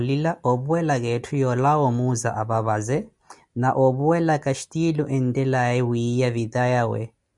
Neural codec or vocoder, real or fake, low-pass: none; real; 10.8 kHz